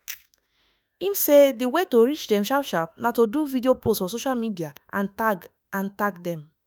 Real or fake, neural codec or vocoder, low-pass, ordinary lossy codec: fake; autoencoder, 48 kHz, 32 numbers a frame, DAC-VAE, trained on Japanese speech; none; none